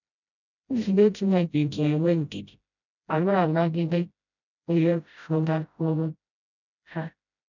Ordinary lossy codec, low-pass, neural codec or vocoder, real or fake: none; 7.2 kHz; codec, 16 kHz, 0.5 kbps, FreqCodec, smaller model; fake